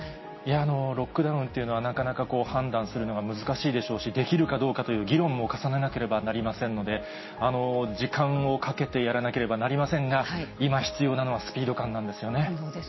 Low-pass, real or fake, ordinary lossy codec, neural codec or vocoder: 7.2 kHz; real; MP3, 24 kbps; none